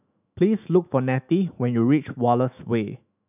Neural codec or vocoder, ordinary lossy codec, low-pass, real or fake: autoencoder, 48 kHz, 128 numbers a frame, DAC-VAE, trained on Japanese speech; none; 3.6 kHz; fake